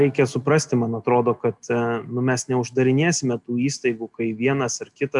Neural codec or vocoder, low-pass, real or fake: none; 10.8 kHz; real